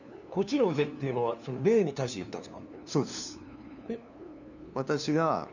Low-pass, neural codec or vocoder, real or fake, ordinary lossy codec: 7.2 kHz; codec, 16 kHz, 2 kbps, FunCodec, trained on LibriTTS, 25 frames a second; fake; none